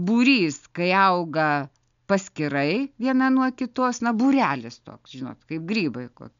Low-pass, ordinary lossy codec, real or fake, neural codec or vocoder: 7.2 kHz; MP3, 64 kbps; real; none